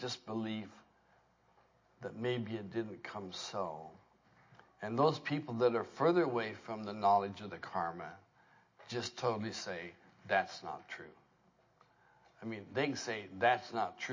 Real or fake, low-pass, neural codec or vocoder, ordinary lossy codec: real; 7.2 kHz; none; MP3, 32 kbps